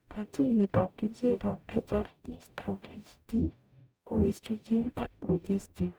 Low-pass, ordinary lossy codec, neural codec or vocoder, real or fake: none; none; codec, 44.1 kHz, 0.9 kbps, DAC; fake